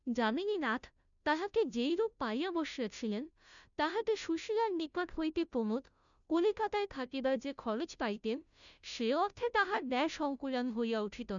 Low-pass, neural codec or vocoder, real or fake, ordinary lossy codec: 7.2 kHz; codec, 16 kHz, 0.5 kbps, FunCodec, trained on Chinese and English, 25 frames a second; fake; none